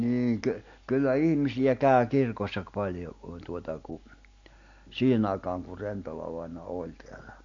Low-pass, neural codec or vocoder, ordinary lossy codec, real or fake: 7.2 kHz; none; AAC, 48 kbps; real